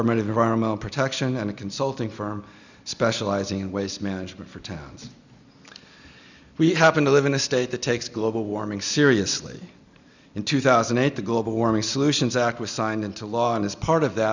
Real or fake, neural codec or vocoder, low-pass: real; none; 7.2 kHz